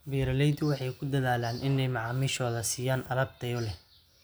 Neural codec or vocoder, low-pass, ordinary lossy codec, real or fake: none; none; none; real